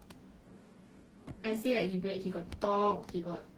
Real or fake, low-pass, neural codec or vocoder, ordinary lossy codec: fake; 19.8 kHz; codec, 44.1 kHz, 2.6 kbps, DAC; Opus, 16 kbps